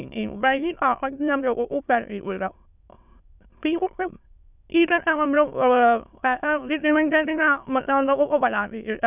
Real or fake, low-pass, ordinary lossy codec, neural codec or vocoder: fake; 3.6 kHz; none; autoencoder, 22.05 kHz, a latent of 192 numbers a frame, VITS, trained on many speakers